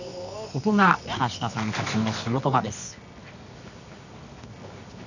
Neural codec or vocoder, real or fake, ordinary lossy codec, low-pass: codec, 24 kHz, 0.9 kbps, WavTokenizer, medium music audio release; fake; none; 7.2 kHz